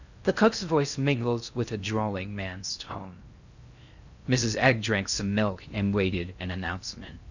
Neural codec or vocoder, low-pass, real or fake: codec, 16 kHz in and 24 kHz out, 0.6 kbps, FocalCodec, streaming, 4096 codes; 7.2 kHz; fake